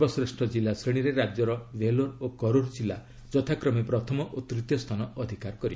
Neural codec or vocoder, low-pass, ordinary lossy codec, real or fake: none; none; none; real